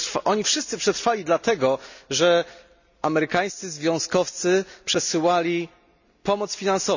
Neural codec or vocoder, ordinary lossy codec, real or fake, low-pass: none; none; real; 7.2 kHz